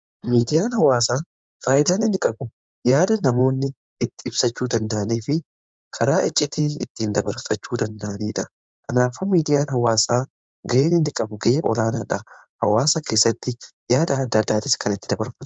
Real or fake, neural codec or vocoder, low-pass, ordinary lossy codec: fake; codec, 16 kHz in and 24 kHz out, 2.2 kbps, FireRedTTS-2 codec; 9.9 kHz; MP3, 96 kbps